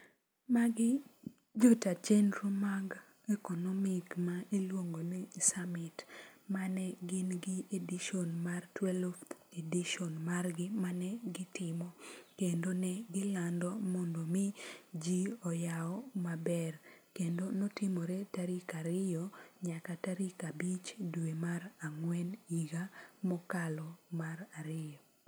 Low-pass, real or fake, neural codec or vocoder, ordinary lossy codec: none; real; none; none